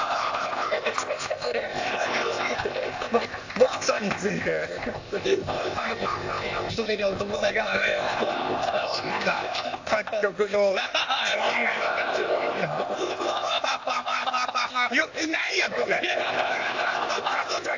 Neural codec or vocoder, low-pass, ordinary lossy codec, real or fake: codec, 16 kHz, 0.8 kbps, ZipCodec; 7.2 kHz; AAC, 48 kbps; fake